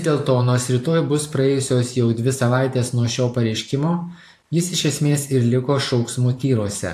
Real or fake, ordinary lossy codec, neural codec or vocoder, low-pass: fake; AAC, 64 kbps; vocoder, 44.1 kHz, 128 mel bands every 512 samples, BigVGAN v2; 14.4 kHz